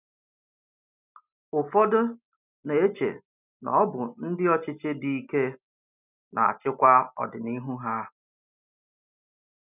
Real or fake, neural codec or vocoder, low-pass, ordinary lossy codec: real; none; 3.6 kHz; none